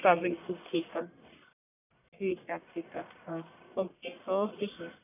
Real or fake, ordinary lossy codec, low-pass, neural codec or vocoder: fake; none; 3.6 kHz; codec, 44.1 kHz, 1.7 kbps, Pupu-Codec